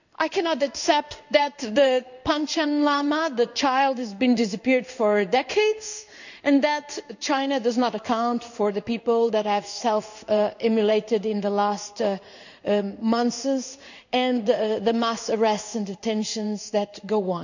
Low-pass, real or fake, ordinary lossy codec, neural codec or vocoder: 7.2 kHz; fake; none; codec, 16 kHz in and 24 kHz out, 1 kbps, XY-Tokenizer